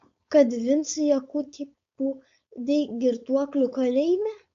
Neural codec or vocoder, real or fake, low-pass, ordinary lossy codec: codec, 16 kHz, 4.8 kbps, FACodec; fake; 7.2 kHz; MP3, 48 kbps